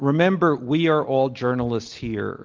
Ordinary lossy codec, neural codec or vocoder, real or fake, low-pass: Opus, 32 kbps; none; real; 7.2 kHz